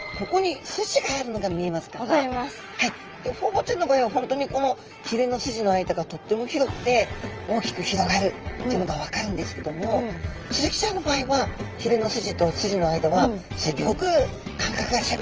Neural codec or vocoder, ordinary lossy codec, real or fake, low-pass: vocoder, 22.05 kHz, 80 mel bands, WaveNeXt; Opus, 24 kbps; fake; 7.2 kHz